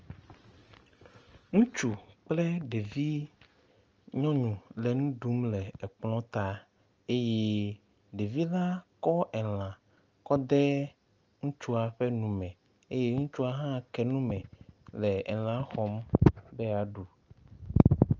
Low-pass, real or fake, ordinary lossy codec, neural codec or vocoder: 7.2 kHz; real; Opus, 24 kbps; none